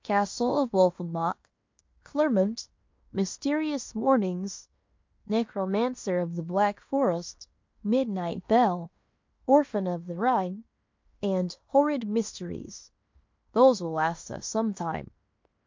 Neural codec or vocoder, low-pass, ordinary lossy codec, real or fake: codec, 16 kHz in and 24 kHz out, 0.9 kbps, LongCat-Audio-Codec, fine tuned four codebook decoder; 7.2 kHz; MP3, 48 kbps; fake